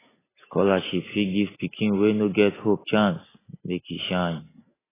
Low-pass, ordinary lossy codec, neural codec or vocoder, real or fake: 3.6 kHz; AAC, 16 kbps; none; real